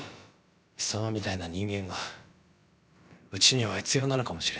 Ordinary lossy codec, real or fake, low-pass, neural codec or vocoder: none; fake; none; codec, 16 kHz, about 1 kbps, DyCAST, with the encoder's durations